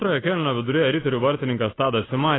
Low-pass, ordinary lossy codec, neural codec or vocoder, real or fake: 7.2 kHz; AAC, 16 kbps; none; real